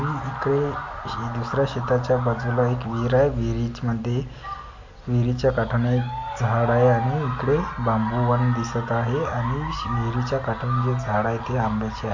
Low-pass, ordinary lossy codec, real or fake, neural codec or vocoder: 7.2 kHz; MP3, 48 kbps; real; none